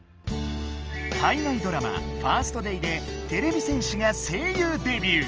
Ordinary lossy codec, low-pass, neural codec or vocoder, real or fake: Opus, 24 kbps; 7.2 kHz; none; real